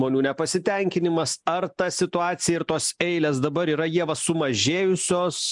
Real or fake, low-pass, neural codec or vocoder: real; 10.8 kHz; none